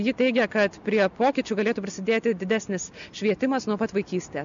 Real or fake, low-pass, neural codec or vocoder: real; 7.2 kHz; none